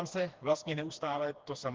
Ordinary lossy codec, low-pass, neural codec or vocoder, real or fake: Opus, 16 kbps; 7.2 kHz; codec, 16 kHz, 2 kbps, FreqCodec, smaller model; fake